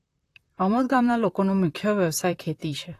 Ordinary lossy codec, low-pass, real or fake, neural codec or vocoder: AAC, 48 kbps; 14.4 kHz; fake; vocoder, 44.1 kHz, 128 mel bands, Pupu-Vocoder